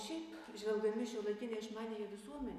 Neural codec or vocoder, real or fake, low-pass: none; real; 14.4 kHz